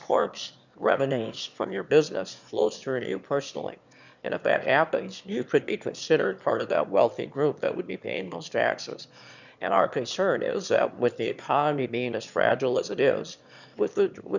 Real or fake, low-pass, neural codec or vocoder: fake; 7.2 kHz; autoencoder, 22.05 kHz, a latent of 192 numbers a frame, VITS, trained on one speaker